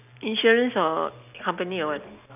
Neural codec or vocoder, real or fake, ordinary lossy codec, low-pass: none; real; none; 3.6 kHz